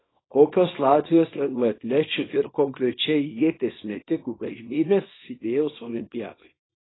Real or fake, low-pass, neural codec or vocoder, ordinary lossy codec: fake; 7.2 kHz; codec, 24 kHz, 0.9 kbps, WavTokenizer, small release; AAC, 16 kbps